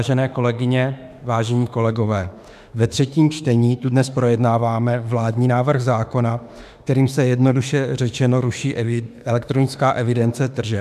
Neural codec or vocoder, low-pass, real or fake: autoencoder, 48 kHz, 32 numbers a frame, DAC-VAE, trained on Japanese speech; 14.4 kHz; fake